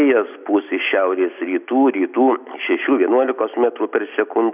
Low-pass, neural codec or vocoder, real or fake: 3.6 kHz; none; real